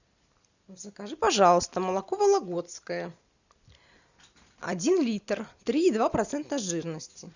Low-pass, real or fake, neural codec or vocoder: 7.2 kHz; real; none